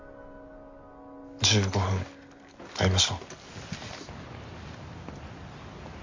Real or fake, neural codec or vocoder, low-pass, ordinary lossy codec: real; none; 7.2 kHz; MP3, 48 kbps